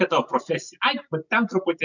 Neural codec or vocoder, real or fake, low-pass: none; real; 7.2 kHz